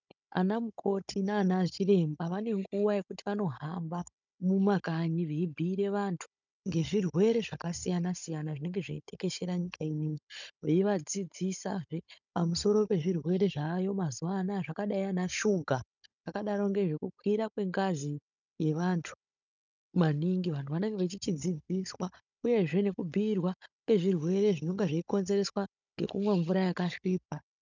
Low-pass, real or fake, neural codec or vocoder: 7.2 kHz; fake; codec, 16 kHz, 16 kbps, FunCodec, trained on Chinese and English, 50 frames a second